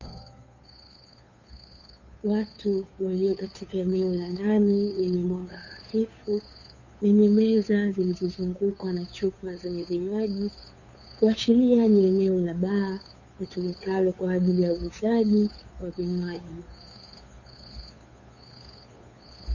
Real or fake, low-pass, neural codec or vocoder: fake; 7.2 kHz; codec, 16 kHz, 2 kbps, FunCodec, trained on Chinese and English, 25 frames a second